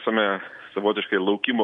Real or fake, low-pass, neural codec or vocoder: real; 10.8 kHz; none